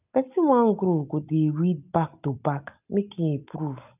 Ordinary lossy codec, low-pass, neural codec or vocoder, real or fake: none; 3.6 kHz; none; real